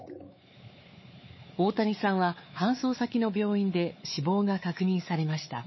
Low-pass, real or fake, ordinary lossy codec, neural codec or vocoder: 7.2 kHz; fake; MP3, 24 kbps; codec, 16 kHz, 4 kbps, X-Codec, WavLM features, trained on Multilingual LibriSpeech